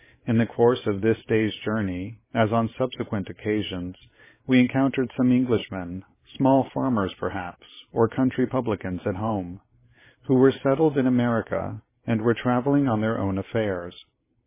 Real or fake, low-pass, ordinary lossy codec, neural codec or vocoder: fake; 3.6 kHz; MP3, 16 kbps; vocoder, 44.1 kHz, 128 mel bands every 512 samples, BigVGAN v2